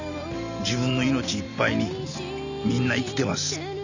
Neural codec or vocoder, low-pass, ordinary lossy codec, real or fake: none; 7.2 kHz; none; real